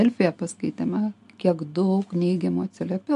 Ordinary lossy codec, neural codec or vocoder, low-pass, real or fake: MP3, 64 kbps; none; 10.8 kHz; real